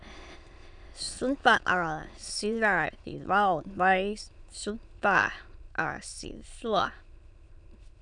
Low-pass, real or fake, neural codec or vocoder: 9.9 kHz; fake; autoencoder, 22.05 kHz, a latent of 192 numbers a frame, VITS, trained on many speakers